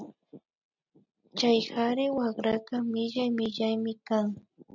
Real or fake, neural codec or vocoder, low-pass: real; none; 7.2 kHz